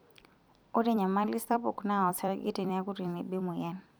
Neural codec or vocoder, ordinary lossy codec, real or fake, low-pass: vocoder, 44.1 kHz, 128 mel bands every 256 samples, BigVGAN v2; none; fake; none